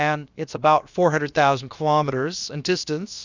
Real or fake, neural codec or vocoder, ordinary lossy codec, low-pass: fake; codec, 16 kHz, about 1 kbps, DyCAST, with the encoder's durations; Opus, 64 kbps; 7.2 kHz